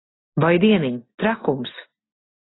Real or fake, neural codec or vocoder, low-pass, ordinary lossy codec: real; none; 7.2 kHz; AAC, 16 kbps